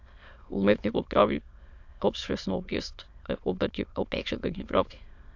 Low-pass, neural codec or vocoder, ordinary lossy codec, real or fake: 7.2 kHz; autoencoder, 22.05 kHz, a latent of 192 numbers a frame, VITS, trained on many speakers; AAC, 48 kbps; fake